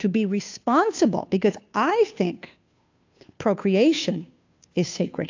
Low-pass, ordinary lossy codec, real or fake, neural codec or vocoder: 7.2 kHz; AAC, 48 kbps; fake; codec, 16 kHz, 2 kbps, FunCodec, trained on Chinese and English, 25 frames a second